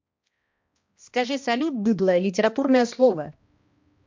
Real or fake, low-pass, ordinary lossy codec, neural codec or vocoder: fake; 7.2 kHz; MP3, 48 kbps; codec, 16 kHz, 1 kbps, X-Codec, HuBERT features, trained on balanced general audio